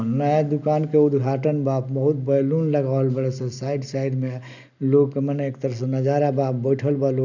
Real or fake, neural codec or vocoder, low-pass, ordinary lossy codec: real; none; 7.2 kHz; none